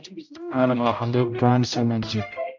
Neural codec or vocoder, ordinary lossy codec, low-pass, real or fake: codec, 16 kHz, 0.5 kbps, X-Codec, HuBERT features, trained on general audio; MP3, 64 kbps; 7.2 kHz; fake